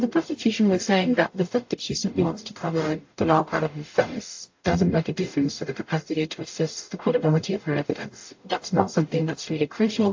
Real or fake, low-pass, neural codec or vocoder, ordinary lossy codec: fake; 7.2 kHz; codec, 44.1 kHz, 0.9 kbps, DAC; AAC, 48 kbps